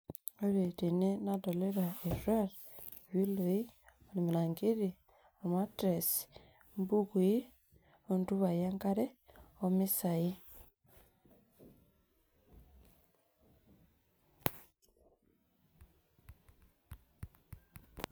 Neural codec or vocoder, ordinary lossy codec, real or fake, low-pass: none; none; real; none